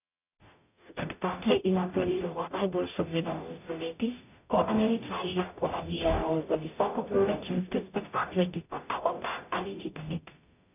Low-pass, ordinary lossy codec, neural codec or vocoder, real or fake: 3.6 kHz; none; codec, 44.1 kHz, 0.9 kbps, DAC; fake